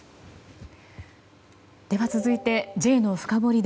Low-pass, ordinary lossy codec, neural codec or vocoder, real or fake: none; none; none; real